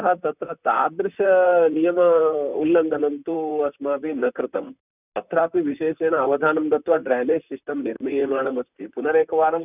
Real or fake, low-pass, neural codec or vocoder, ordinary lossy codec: fake; 3.6 kHz; vocoder, 44.1 kHz, 128 mel bands, Pupu-Vocoder; none